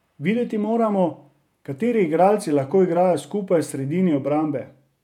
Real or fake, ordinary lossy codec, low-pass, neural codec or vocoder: fake; none; 19.8 kHz; vocoder, 48 kHz, 128 mel bands, Vocos